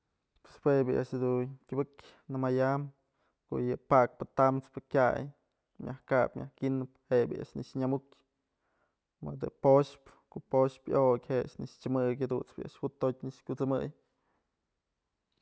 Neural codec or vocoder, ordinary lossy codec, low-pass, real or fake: none; none; none; real